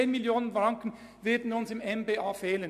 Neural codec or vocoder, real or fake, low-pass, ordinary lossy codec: none; real; 14.4 kHz; none